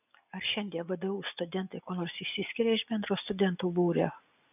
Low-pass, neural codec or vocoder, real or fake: 3.6 kHz; none; real